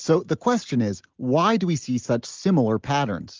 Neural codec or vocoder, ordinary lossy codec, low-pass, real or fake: none; Opus, 32 kbps; 7.2 kHz; real